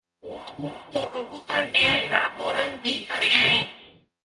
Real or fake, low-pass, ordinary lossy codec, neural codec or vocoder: fake; 10.8 kHz; MP3, 96 kbps; codec, 44.1 kHz, 0.9 kbps, DAC